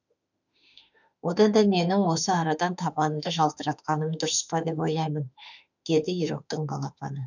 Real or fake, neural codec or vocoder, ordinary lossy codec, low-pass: fake; autoencoder, 48 kHz, 32 numbers a frame, DAC-VAE, trained on Japanese speech; none; 7.2 kHz